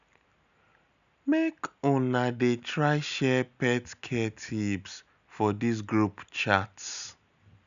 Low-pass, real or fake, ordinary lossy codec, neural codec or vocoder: 7.2 kHz; real; none; none